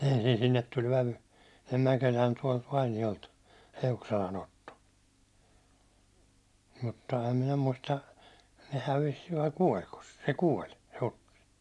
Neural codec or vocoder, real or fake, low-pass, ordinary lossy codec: none; real; none; none